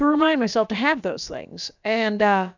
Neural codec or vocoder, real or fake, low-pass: codec, 16 kHz, about 1 kbps, DyCAST, with the encoder's durations; fake; 7.2 kHz